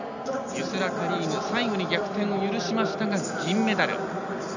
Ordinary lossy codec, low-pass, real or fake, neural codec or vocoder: none; 7.2 kHz; real; none